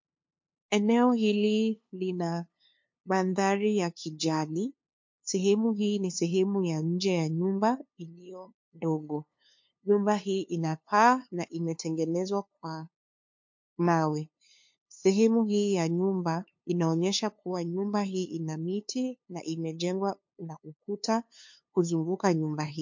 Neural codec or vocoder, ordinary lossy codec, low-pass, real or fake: codec, 16 kHz, 2 kbps, FunCodec, trained on LibriTTS, 25 frames a second; MP3, 48 kbps; 7.2 kHz; fake